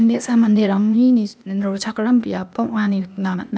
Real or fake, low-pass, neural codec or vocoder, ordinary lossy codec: fake; none; codec, 16 kHz, 0.8 kbps, ZipCodec; none